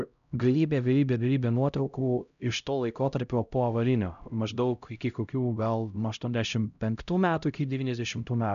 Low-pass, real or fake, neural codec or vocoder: 7.2 kHz; fake; codec, 16 kHz, 0.5 kbps, X-Codec, HuBERT features, trained on LibriSpeech